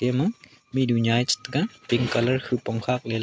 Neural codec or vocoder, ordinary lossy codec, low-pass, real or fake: none; none; none; real